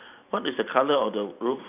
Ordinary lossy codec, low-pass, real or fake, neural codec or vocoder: none; 3.6 kHz; fake; vocoder, 44.1 kHz, 128 mel bands every 512 samples, BigVGAN v2